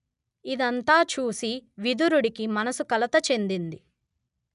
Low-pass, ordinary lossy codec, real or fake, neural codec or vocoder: 10.8 kHz; none; real; none